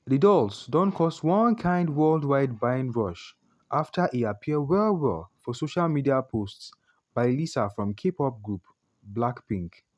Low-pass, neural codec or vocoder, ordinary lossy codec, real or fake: none; none; none; real